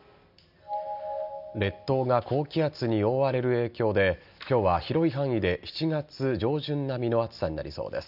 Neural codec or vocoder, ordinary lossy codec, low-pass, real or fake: none; none; 5.4 kHz; real